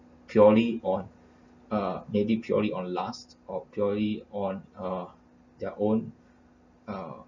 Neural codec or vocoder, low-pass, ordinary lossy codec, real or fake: none; 7.2 kHz; none; real